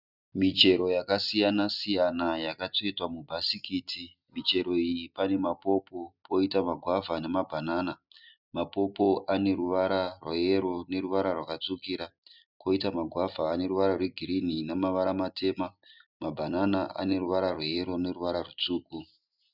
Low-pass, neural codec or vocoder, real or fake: 5.4 kHz; none; real